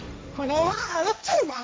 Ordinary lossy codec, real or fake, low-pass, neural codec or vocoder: none; fake; none; codec, 16 kHz, 1.1 kbps, Voila-Tokenizer